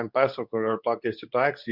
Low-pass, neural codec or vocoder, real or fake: 5.4 kHz; codec, 24 kHz, 0.9 kbps, WavTokenizer, medium speech release version 2; fake